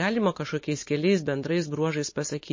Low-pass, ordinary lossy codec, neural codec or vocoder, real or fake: 7.2 kHz; MP3, 32 kbps; none; real